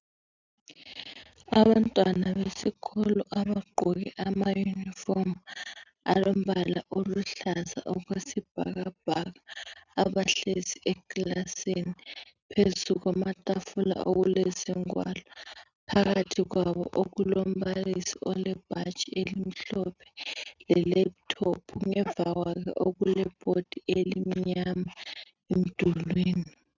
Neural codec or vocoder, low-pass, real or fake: none; 7.2 kHz; real